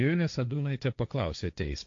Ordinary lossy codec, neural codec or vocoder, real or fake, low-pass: AAC, 48 kbps; codec, 16 kHz, 1.1 kbps, Voila-Tokenizer; fake; 7.2 kHz